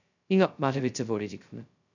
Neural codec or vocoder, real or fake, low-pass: codec, 16 kHz, 0.2 kbps, FocalCodec; fake; 7.2 kHz